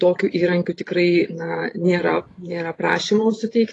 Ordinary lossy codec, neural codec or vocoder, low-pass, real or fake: AAC, 32 kbps; vocoder, 44.1 kHz, 128 mel bands every 512 samples, BigVGAN v2; 10.8 kHz; fake